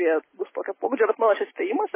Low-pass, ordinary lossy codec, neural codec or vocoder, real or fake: 3.6 kHz; MP3, 16 kbps; none; real